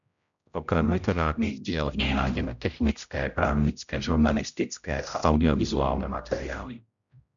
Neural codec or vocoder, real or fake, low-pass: codec, 16 kHz, 0.5 kbps, X-Codec, HuBERT features, trained on general audio; fake; 7.2 kHz